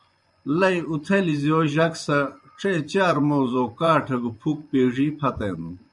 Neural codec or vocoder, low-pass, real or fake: vocoder, 24 kHz, 100 mel bands, Vocos; 10.8 kHz; fake